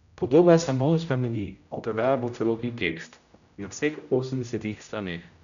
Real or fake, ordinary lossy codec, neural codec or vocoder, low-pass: fake; none; codec, 16 kHz, 0.5 kbps, X-Codec, HuBERT features, trained on general audio; 7.2 kHz